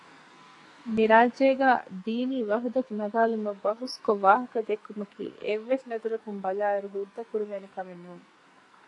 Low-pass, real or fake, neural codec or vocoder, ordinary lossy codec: 10.8 kHz; fake; codec, 44.1 kHz, 2.6 kbps, SNAC; AAC, 48 kbps